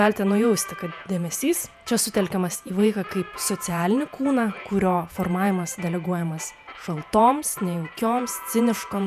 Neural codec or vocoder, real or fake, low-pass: vocoder, 48 kHz, 128 mel bands, Vocos; fake; 14.4 kHz